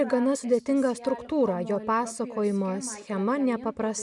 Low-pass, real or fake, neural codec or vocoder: 10.8 kHz; real; none